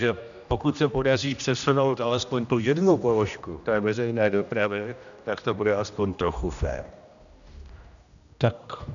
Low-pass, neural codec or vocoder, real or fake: 7.2 kHz; codec, 16 kHz, 1 kbps, X-Codec, HuBERT features, trained on general audio; fake